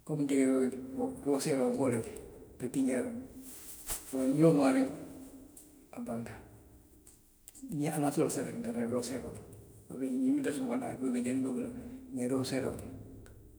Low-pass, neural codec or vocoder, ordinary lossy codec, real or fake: none; autoencoder, 48 kHz, 32 numbers a frame, DAC-VAE, trained on Japanese speech; none; fake